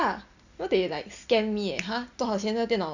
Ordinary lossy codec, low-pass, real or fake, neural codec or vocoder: none; 7.2 kHz; real; none